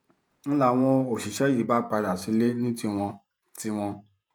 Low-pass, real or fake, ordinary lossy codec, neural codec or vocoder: 19.8 kHz; fake; none; vocoder, 48 kHz, 128 mel bands, Vocos